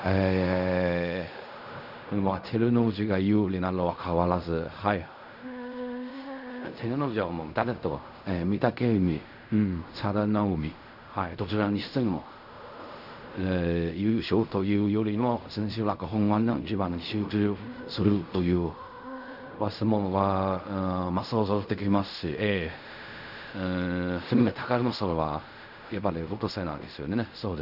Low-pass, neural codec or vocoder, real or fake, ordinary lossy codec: 5.4 kHz; codec, 16 kHz in and 24 kHz out, 0.4 kbps, LongCat-Audio-Codec, fine tuned four codebook decoder; fake; none